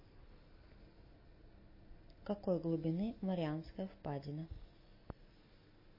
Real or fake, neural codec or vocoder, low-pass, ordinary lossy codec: real; none; 5.4 kHz; MP3, 24 kbps